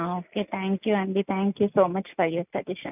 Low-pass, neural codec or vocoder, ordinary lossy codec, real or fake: 3.6 kHz; none; none; real